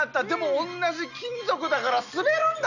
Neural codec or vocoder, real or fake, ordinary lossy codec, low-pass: none; real; none; 7.2 kHz